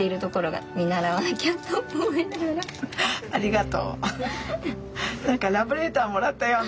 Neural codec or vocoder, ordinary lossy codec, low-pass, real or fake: none; none; none; real